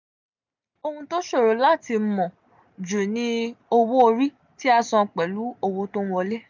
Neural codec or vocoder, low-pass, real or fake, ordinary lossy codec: none; 7.2 kHz; real; none